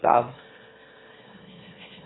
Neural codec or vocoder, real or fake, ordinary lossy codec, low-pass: autoencoder, 22.05 kHz, a latent of 192 numbers a frame, VITS, trained on one speaker; fake; AAC, 16 kbps; 7.2 kHz